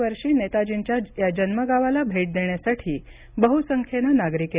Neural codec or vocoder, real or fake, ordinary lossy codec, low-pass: none; real; Opus, 64 kbps; 3.6 kHz